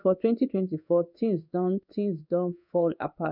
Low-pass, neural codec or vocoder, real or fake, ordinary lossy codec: 5.4 kHz; codec, 16 kHz in and 24 kHz out, 1 kbps, XY-Tokenizer; fake; none